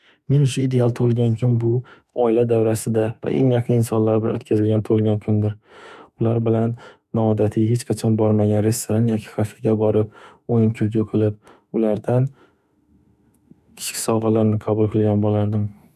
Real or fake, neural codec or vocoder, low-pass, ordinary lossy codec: fake; autoencoder, 48 kHz, 32 numbers a frame, DAC-VAE, trained on Japanese speech; 14.4 kHz; none